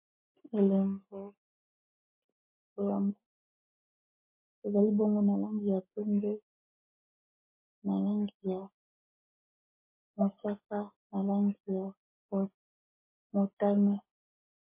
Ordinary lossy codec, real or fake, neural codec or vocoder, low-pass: MP3, 24 kbps; real; none; 3.6 kHz